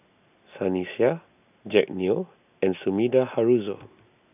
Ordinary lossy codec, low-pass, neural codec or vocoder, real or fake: none; 3.6 kHz; none; real